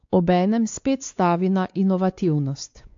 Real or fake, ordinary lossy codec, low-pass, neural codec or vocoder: fake; AAC, 48 kbps; 7.2 kHz; codec, 16 kHz, 4 kbps, X-Codec, WavLM features, trained on Multilingual LibriSpeech